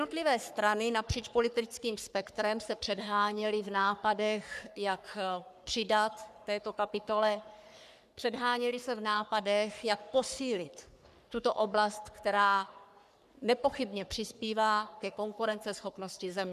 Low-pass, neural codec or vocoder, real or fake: 14.4 kHz; codec, 44.1 kHz, 3.4 kbps, Pupu-Codec; fake